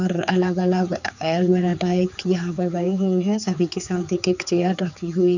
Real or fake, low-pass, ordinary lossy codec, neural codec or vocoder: fake; 7.2 kHz; none; codec, 16 kHz, 4 kbps, X-Codec, HuBERT features, trained on general audio